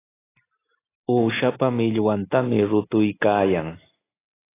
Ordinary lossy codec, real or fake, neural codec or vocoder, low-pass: AAC, 16 kbps; real; none; 3.6 kHz